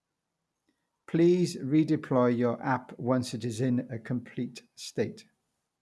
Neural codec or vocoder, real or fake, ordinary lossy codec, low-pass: none; real; none; none